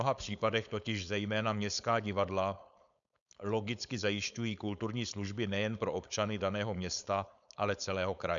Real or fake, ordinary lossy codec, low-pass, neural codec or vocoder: fake; MP3, 96 kbps; 7.2 kHz; codec, 16 kHz, 4.8 kbps, FACodec